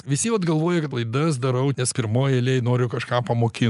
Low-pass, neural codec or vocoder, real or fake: 10.8 kHz; none; real